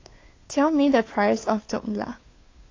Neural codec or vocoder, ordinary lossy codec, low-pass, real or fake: codec, 16 kHz, 2 kbps, FunCodec, trained on Chinese and English, 25 frames a second; AAC, 32 kbps; 7.2 kHz; fake